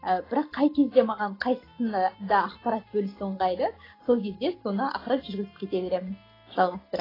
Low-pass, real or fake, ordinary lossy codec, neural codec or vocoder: 5.4 kHz; real; AAC, 24 kbps; none